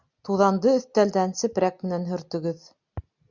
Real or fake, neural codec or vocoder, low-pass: real; none; 7.2 kHz